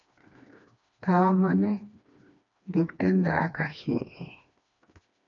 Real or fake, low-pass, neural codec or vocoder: fake; 7.2 kHz; codec, 16 kHz, 2 kbps, FreqCodec, smaller model